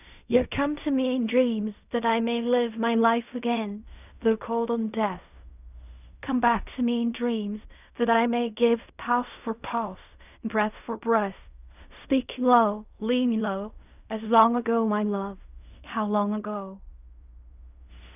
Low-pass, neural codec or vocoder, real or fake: 3.6 kHz; codec, 16 kHz in and 24 kHz out, 0.4 kbps, LongCat-Audio-Codec, fine tuned four codebook decoder; fake